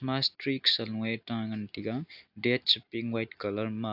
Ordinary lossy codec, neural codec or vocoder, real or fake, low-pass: AAC, 48 kbps; none; real; 5.4 kHz